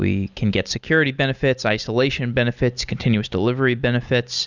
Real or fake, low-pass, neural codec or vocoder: real; 7.2 kHz; none